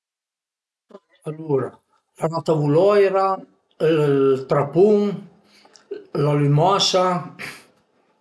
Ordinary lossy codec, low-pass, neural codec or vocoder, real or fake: none; none; none; real